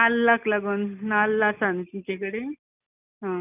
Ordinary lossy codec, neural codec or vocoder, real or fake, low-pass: none; none; real; 3.6 kHz